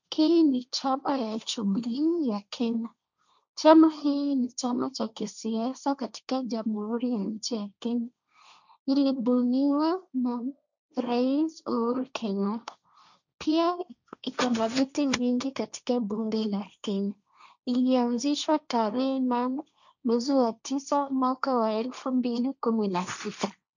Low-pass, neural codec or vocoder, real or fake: 7.2 kHz; codec, 16 kHz, 1.1 kbps, Voila-Tokenizer; fake